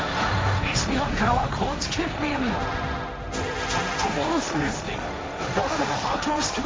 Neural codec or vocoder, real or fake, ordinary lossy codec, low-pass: codec, 16 kHz, 1.1 kbps, Voila-Tokenizer; fake; none; none